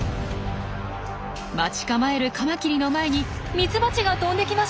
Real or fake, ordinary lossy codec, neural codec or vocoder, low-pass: real; none; none; none